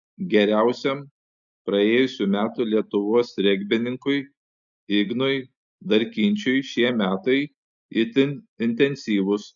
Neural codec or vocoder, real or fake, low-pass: none; real; 7.2 kHz